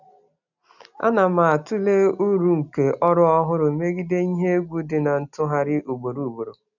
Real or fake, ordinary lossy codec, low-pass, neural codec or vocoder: real; none; 7.2 kHz; none